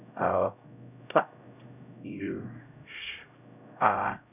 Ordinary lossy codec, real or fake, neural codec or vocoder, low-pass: none; fake; codec, 16 kHz, 0.5 kbps, X-Codec, HuBERT features, trained on LibriSpeech; 3.6 kHz